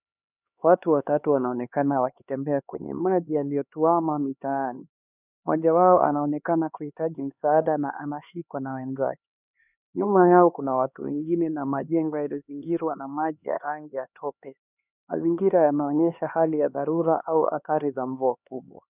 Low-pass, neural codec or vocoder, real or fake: 3.6 kHz; codec, 16 kHz, 2 kbps, X-Codec, HuBERT features, trained on LibriSpeech; fake